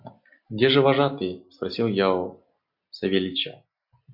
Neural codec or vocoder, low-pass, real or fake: none; 5.4 kHz; real